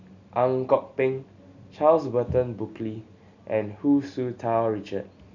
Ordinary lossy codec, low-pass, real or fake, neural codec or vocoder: none; 7.2 kHz; real; none